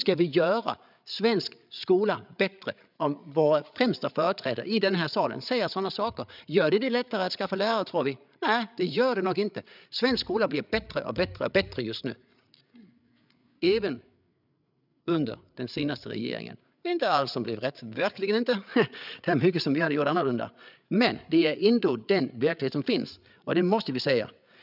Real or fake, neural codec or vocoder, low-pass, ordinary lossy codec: fake; codec, 16 kHz, 8 kbps, FreqCodec, larger model; 5.4 kHz; none